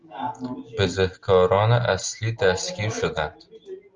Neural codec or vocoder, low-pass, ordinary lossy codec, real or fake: none; 7.2 kHz; Opus, 32 kbps; real